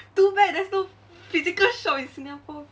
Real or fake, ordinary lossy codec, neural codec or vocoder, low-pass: real; none; none; none